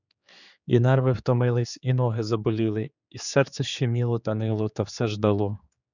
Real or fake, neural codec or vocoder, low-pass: fake; codec, 16 kHz, 4 kbps, X-Codec, HuBERT features, trained on general audio; 7.2 kHz